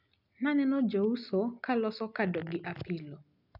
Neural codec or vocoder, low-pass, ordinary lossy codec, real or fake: none; 5.4 kHz; none; real